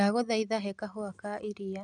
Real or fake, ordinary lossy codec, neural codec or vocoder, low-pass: real; none; none; 10.8 kHz